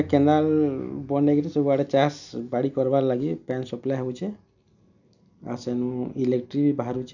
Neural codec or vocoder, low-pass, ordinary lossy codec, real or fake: none; 7.2 kHz; none; real